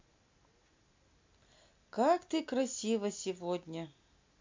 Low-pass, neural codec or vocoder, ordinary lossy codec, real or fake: 7.2 kHz; none; MP3, 64 kbps; real